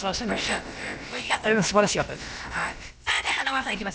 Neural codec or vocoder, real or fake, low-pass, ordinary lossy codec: codec, 16 kHz, about 1 kbps, DyCAST, with the encoder's durations; fake; none; none